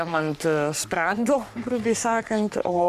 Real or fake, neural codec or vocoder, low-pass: fake; codec, 44.1 kHz, 3.4 kbps, Pupu-Codec; 14.4 kHz